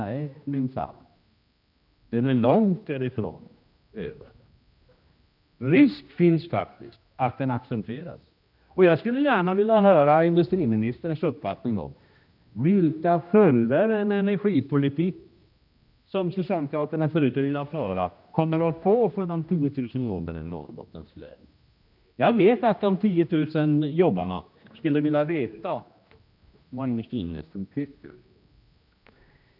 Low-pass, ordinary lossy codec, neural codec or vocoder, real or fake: 5.4 kHz; none; codec, 16 kHz, 1 kbps, X-Codec, HuBERT features, trained on general audio; fake